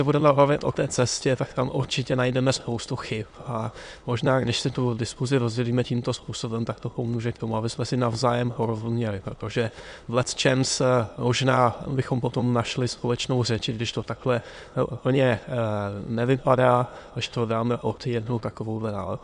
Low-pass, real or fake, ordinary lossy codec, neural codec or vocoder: 9.9 kHz; fake; MP3, 64 kbps; autoencoder, 22.05 kHz, a latent of 192 numbers a frame, VITS, trained on many speakers